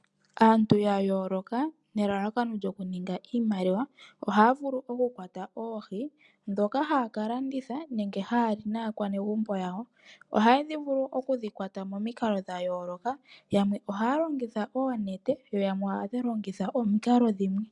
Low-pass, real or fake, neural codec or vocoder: 10.8 kHz; real; none